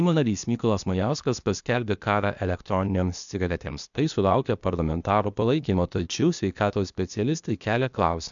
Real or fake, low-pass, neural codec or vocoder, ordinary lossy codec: fake; 7.2 kHz; codec, 16 kHz, 0.8 kbps, ZipCodec; MP3, 96 kbps